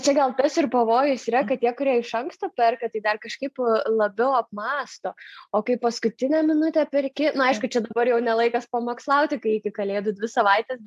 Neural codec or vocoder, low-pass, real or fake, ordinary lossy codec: none; 14.4 kHz; real; AAC, 96 kbps